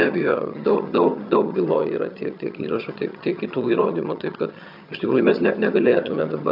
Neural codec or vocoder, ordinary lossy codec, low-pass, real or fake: vocoder, 22.05 kHz, 80 mel bands, HiFi-GAN; AAC, 48 kbps; 5.4 kHz; fake